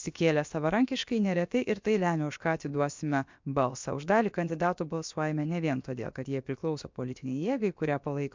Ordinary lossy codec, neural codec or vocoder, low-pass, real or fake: MP3, 64 kbps; codec, 16 kHz, about 1 kbps, DyCAST, with the encoder's durations; 7.2 kHz; fake